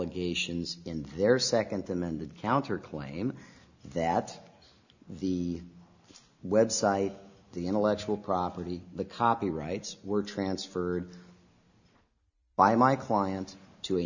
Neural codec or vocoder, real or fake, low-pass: none; real; 7.2 kHz